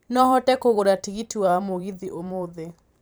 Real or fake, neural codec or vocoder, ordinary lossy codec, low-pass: fake; vocoder, 44.1 kHz, 128 mel bands every 512 samples, BigVGAN v2; none; none